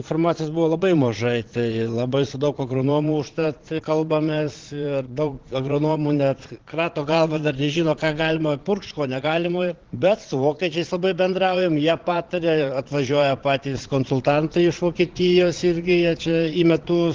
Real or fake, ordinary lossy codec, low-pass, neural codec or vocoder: fake; Opus, 16 kbps; 7.2 kHz; vocoder, 22.05 kHz, 80 mel bands, Vocos